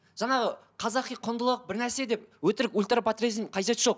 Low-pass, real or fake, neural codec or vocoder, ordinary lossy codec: none; real; none; none